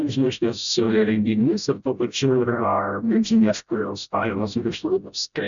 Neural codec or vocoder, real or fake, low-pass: codec, 16 kHz, 0.5 kbps, FreqCodec, smaller model; fake; 7.2 kHz